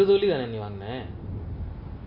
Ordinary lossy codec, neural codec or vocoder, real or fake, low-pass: MP3, 32 kbps; none; real; 5.4 kHz